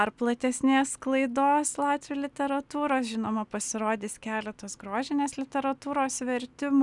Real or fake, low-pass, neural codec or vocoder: real; 10.8 kHz; none